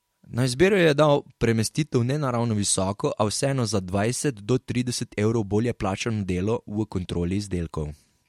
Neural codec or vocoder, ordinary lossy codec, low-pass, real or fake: none; MP3, 64 kbps; 19.8 kHz; real